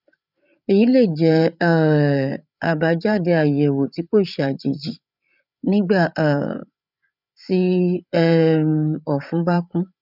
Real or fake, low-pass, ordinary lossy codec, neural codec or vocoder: fake; 5.4 kHz; none; codec, 16 kHz, 8 kbps, FreqCodec, larger model